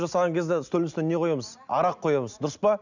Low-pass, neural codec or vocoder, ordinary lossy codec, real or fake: 7.2 kHz; none; none; real